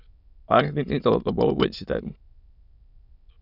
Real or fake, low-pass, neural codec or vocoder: fake; 5.4 kHz; autoencoder, 22.05 kHz, a latent of 192 numbers a frame, VITS, trained on many speakers